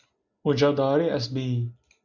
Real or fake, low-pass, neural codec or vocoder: real; 7.2 kHz; none